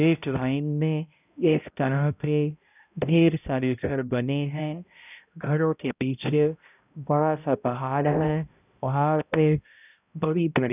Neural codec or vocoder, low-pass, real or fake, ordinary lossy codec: codec, 16 kHz, 0.5 kbps, X-Codec, HuBERT features, trained on balanced general audio; 3.6 kHz; fake; none